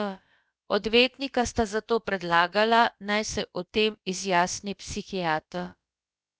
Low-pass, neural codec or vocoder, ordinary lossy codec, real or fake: none; codec, 16 kHz, about 1 kbps, DyCAST, with the encoder's durations; none; fake